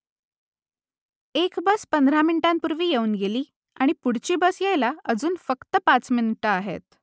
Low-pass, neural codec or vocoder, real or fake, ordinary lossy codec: none; none; real; none